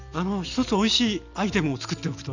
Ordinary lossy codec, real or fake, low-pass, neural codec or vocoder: none; real; 7.2 kHz; none